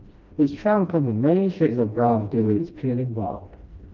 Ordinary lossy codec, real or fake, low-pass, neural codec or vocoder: Opus, 24 kbps; fake; 7.2 kHz; codec, 16 kHz, 1 kbps, FreqCodec, smaller model